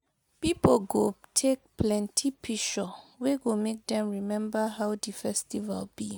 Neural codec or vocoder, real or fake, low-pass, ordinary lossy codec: none; real; none; none